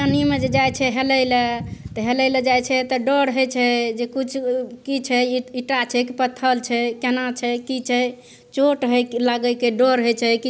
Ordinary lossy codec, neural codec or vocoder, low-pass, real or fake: none; none; none; real